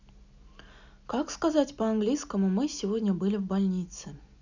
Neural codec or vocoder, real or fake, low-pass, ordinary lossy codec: none; real; 7.2 kHz; none